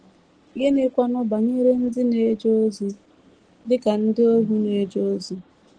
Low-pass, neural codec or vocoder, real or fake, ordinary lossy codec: 9.9 kHz; none; real; Opus, 16 kbps